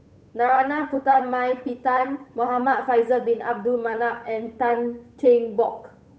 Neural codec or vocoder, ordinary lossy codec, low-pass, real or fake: codec, 16 kHz, 8 kbps, FunCodec, trained on Chinese and English, 25 frames a second; none; none; fake